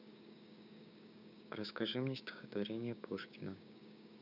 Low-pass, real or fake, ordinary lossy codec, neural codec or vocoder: 5.4 kHz; fake; none; codec, 16 kHz, 6 kbps, DAC